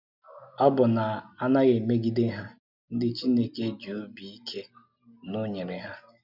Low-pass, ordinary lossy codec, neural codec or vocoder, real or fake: 5.4 kHz; none; none; real